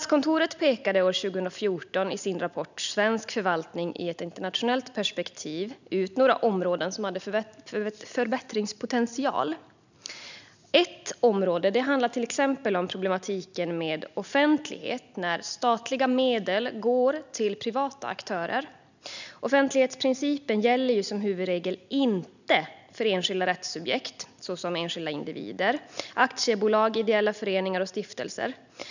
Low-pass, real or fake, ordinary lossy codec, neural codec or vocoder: 7.2 kHz; real; none; none